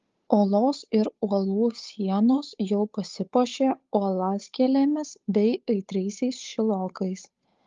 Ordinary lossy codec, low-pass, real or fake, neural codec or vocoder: Opus, 32 kbps; 7.2 kHz; fake; codec, 16 kHz, 8 kbps, FunCodec, trained on Chinese and English, 25 frames a second